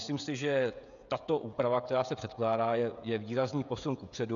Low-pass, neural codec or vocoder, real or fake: 7.2 kHz; codec, 16 kHz, 16 kbps, FreqCodec, smaller model; fake